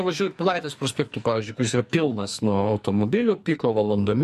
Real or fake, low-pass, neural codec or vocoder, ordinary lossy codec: fake; 14.4 kHz; codec, 44.1 kHz, 2.6 kbps, SNAC; MP3, 64 kbps